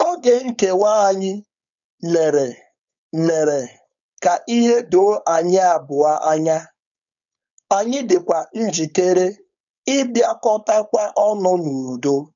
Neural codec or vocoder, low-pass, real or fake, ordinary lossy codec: codec, 16 kHz, 4.8 kbps, FACodec; 7.2 kHz; fake; none